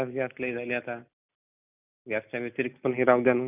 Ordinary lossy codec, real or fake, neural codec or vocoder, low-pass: none; real; none; 3.6 kHz